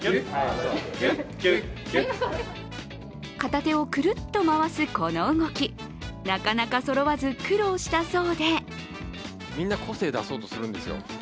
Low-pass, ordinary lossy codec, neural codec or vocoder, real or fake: none; none; none; real